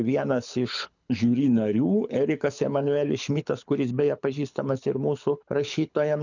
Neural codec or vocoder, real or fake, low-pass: codec, 24 kHz, 6 kbps, HILCodec; fake; 7.2 kHz